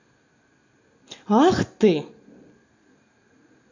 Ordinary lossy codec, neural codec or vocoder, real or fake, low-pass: AAC, 48 kbps; none; real; 7.2 kHz